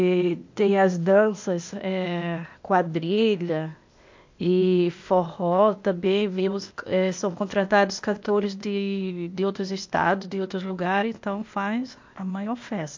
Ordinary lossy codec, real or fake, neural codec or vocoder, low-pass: MP3, 48 kbps; fake; codec, 16 kHz, 0.8 kbps, ZipCodec; 7.2 kHz